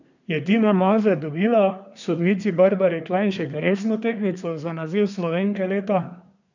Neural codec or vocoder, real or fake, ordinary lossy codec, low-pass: codec, 24 kHz, 1 kbps, SNAC; fake; none; 7.2 kHz